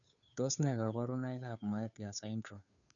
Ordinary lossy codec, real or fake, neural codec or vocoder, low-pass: none; fake; codec, 16 kHz, 2 kbps, FunCodec, trained on Chinese and English, 25 frames a second; 7.2 kHz